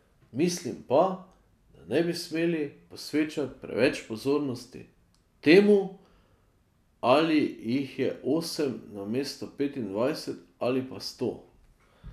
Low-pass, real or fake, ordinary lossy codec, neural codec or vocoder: 14.4 kHz; real; none; none